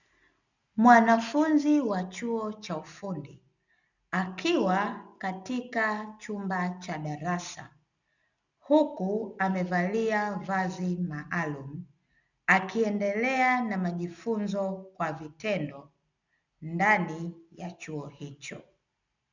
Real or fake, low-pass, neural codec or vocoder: real; 7.2 kHz; none